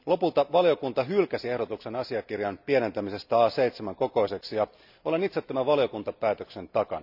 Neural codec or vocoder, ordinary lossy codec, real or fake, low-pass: none; none; real; 5.4 kHz